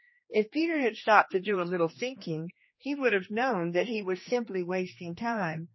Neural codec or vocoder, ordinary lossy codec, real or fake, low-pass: codec, 16 kHz, 2 kbps, X-Codec, HuBERT features, trained on general audio; MP3, 24 kbps; fake; 7.2 kHz